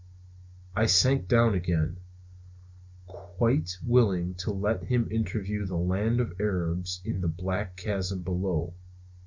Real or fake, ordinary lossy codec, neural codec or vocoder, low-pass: real; MP3, 64 kbps; none; 7.2 kHz